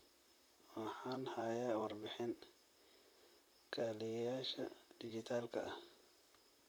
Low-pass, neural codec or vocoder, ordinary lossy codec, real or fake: none; vocoder, 44.1 kHz, 128 mel bands, Pupu-Vocoder; none; fake